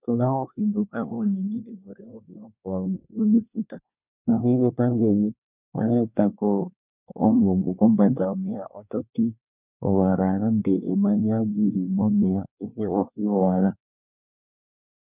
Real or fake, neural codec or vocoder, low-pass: fake; codec, 24 kHz, 1 kbps, SNAC; 3.6 kHz